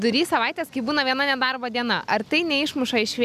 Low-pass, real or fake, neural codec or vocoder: 14.4 kHz; real; none